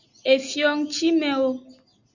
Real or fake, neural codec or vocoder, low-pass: real; none; 7.2 kHz